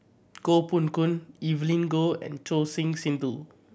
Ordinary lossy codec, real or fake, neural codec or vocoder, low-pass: none; real; none; none